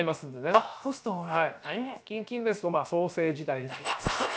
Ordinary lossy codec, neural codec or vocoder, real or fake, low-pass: none; codec, 16 kHz, 0.7 kbps, FocalCodec; fake; none